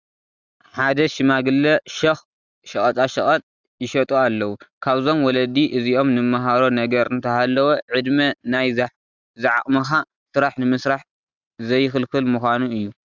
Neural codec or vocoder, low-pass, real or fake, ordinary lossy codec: none; 7.2 kHz; real; Opus, 64 kbps